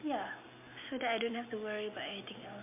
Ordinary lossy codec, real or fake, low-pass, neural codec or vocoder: MP3, 32 kbps; real; 3.6 kHz; none